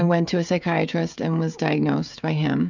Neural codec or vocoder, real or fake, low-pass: codec, 16 kHz, 16 kbps, FreqCodec, smaller model; fake; 7.2 kHz